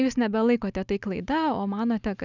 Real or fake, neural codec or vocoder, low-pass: real; none; 7.2 kHz